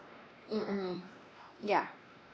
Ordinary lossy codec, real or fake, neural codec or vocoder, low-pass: none; fake; codec, 16 kHz, 2 kbps, X-Codec, WavLM features, trained on Multilingual LibriSpeech; none